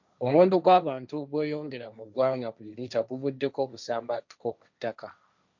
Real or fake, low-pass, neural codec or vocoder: fake; 7.2 kHz; codec, 16 kHz, 1.1 kbps, Voila-Tokenizer